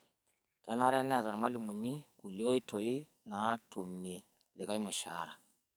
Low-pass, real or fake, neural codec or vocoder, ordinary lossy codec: none; fake; codec, 44.1 kHz, 2.6 kbps, SNAC; none